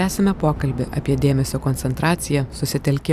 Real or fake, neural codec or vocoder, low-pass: real; none; 14.4 kHz